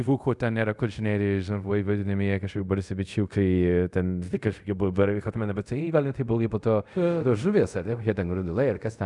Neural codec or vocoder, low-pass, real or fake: codec, 24 kHz, 0.5 kbps, DualCodec; 10.8 kHz; fake